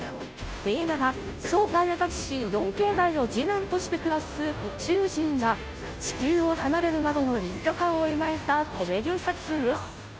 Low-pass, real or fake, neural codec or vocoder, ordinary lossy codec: none; fake; codec, 16 kHz, 0.5 kbps, FunCodec, trained on Chinese and English, 25 frames a second; none